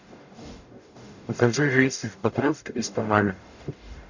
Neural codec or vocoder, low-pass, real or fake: codec, 44.1 kHz, 0.9 kbps, DAC; 7.2 kHz; fake